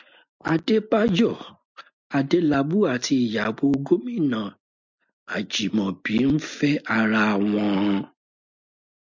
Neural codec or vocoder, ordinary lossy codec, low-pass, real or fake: none; MP3, 64 kbps; 7.2 kHz; real